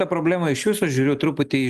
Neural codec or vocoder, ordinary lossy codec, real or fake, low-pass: none; Opus, 32 kbps; real; 14.4 kHz